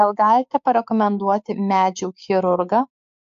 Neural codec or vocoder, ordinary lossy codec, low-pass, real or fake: codec, 16 kHz, 6 kbps, DAC; AAC, 64 kbps; 7.2 kHz; fake